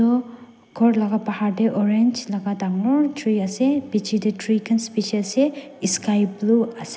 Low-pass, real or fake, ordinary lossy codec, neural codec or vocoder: none; real; none; none